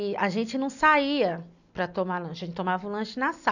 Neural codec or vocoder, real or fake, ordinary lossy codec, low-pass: none; real; none; 7.2 kHz